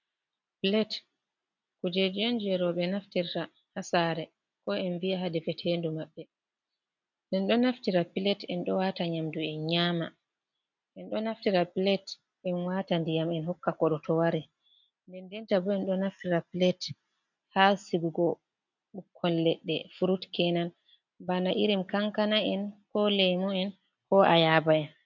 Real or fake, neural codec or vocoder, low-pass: real; none; 7.2 kHz